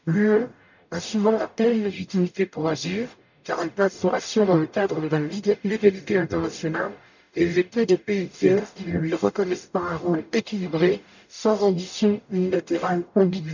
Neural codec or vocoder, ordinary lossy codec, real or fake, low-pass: codec, 44.1 kHz, 0.9 kbps, DAC; none; fake; 7.2 kHz